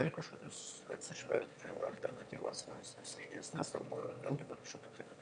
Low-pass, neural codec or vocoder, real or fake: 9.9 kHz; autoencoder, 22.05 kHz, a latent of 192 numbers a frame, VITS, trained on one speaker; fake